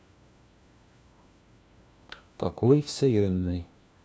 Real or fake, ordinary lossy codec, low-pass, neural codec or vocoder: fake; none; none; codec, 16 kHz, 1 kbps, FunCodec, trained on LibriTTS, 50 frames a second